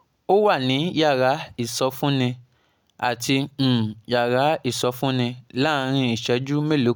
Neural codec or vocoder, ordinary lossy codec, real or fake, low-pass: none; none; real; none